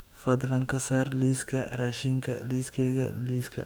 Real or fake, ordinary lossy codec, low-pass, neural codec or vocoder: fake; none; none; codec, 44.1 kHz, 2.6 kbps, DAC